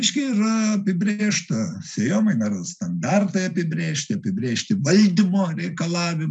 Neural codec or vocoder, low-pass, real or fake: none; 9.9 kHz; real